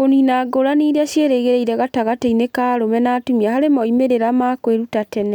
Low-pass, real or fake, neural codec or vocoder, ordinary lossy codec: 19.8 kHz; real; none; none